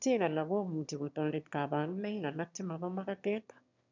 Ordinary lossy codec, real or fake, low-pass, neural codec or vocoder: none; fake; 7.2 kHz; autoencoder, 22.05 kHz, a latent of 192 numbers a frame, VITS, trained on one speaker